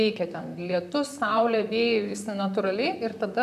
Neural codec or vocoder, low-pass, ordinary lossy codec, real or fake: vocoder, 44.1 kHz, 128 mel bands, Pupu-Vocoder; 14.4 kHz; AAC, 96 kbps; fake